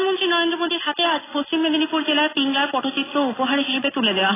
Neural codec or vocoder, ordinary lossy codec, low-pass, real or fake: none; AAC, 16 kbps; 3.6 kHz; real